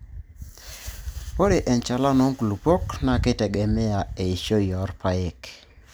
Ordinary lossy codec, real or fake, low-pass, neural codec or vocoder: none; real; none; none